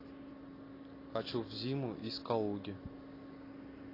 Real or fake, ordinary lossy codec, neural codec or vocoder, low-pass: real; AAC, 24 kbps; none; 5.4 kHz